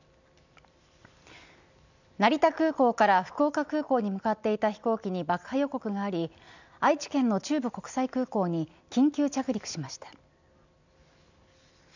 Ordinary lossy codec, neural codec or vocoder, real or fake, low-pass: none; none; real; 7.2 kHz